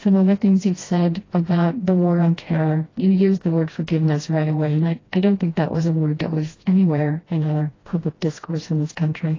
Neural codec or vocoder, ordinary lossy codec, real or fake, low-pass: codec, 16 kHz, 1 kbps, FreqCodec, smaller model; AAC, 32 kbps; fake; 7.2 kHz